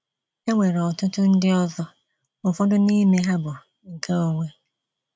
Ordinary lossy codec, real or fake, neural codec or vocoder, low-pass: none; real; none; none